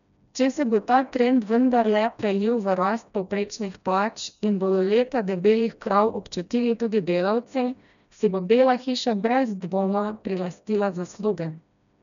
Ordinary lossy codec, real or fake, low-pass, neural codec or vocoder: none; fake; 7.2 kHz; codec, 16 kHz, 1 kbps, FreqCodec, smaller model